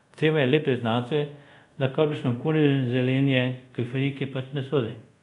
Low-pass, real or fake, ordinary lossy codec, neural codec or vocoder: 10.8 kHz; fake; none; codec, 24 kHz, 0.5 kbps, DualCodec